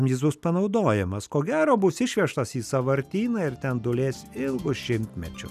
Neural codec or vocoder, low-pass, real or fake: none; 14.4 kHz; real